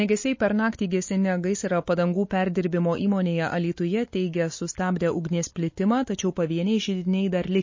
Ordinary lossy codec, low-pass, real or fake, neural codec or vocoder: MP3, 32 kbps; 7.2 kHz; real; none